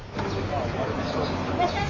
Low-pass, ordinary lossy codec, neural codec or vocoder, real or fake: 7.2 kHz; MP3, 32 kbps; none; real